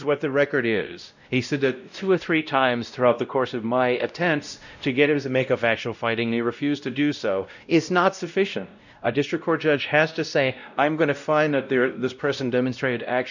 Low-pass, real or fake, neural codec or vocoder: 7.2 kHz; fake; codec, 16 kHz, 0.5 kbps, X-Codec, WavLM features, trained on Multilingual LibriSpeech